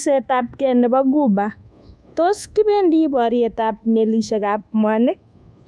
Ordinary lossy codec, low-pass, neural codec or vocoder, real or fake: none; none; codec, 24 kHz, 1.2 kbps, DualCodec; fake